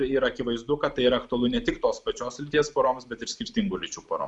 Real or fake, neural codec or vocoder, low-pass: real; none; 10.8 kHz